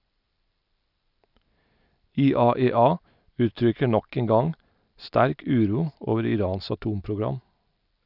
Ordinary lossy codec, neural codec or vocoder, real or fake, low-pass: none; none; real; 5.4 kHz